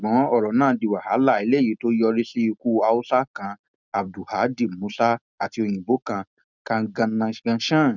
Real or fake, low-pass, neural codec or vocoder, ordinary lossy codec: real; 7.2 kHz; none; none